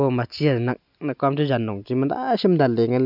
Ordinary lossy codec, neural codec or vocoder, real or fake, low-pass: none; none; real; 5.4 kHz